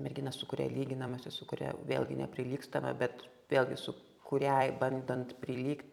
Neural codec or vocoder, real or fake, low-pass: vocoder, 44.1 kHz, 128 mel bands every 256 samples, BigVGAN v2; fake; 19.8 kHz